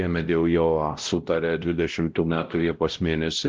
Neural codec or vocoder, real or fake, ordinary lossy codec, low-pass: codec, 16 kHz, 0.5 kbps, X-Codec, WavLM features, trained on Multilingual LibriSpeech; fake; Opus, 16 kbps; 7.2 kHz